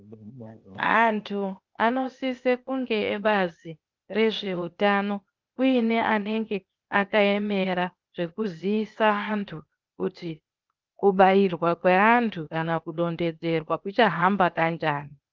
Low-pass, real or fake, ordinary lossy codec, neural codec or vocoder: 7.2 kHz; fake; Opus, 24 kbps; codec, 16 kHz, 0.8 kbps, ZipCodec